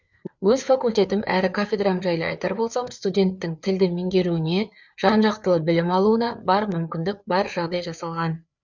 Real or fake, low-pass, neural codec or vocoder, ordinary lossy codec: fake; 7.2 kHz; codec, 16 kHz, 4 kbps, FreqCodec, larger model; none